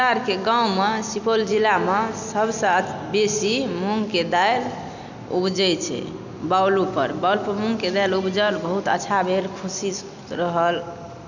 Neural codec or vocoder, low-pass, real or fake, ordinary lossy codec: none; 7.2 kHz; real; none